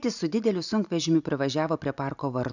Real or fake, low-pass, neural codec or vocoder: real; 7.2 kHz; none